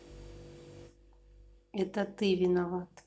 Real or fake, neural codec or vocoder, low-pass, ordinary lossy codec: real; none; none; none